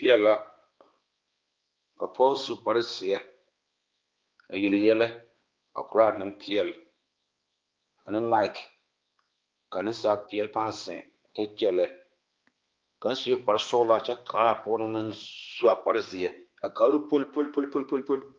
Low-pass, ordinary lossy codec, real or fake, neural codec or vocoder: 7.2 kHz; Opus, 24 kbps; fake; codec, 16 kHz, 2 kbps, X-Codec, HuBERT features, trained on balanced general audio